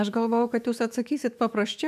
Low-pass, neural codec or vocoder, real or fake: 14.4 kHz; autoencoder, 48 kHz, 128 numbers a frame, DAC-VAE, trained on Japanese speech; fake